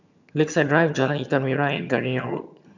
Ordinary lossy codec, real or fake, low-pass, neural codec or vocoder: none; fake; 7.2 kHz; vocoder, 22.05 kHz, 80 mel bands, HiFi-GAN